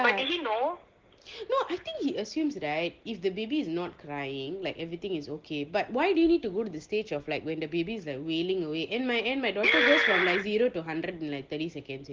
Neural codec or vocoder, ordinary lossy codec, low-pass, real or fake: none; Opus, 16 kbps; 7.2 kHz; real